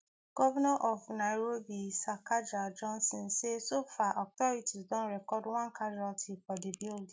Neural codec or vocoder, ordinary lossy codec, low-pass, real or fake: none; none; none; real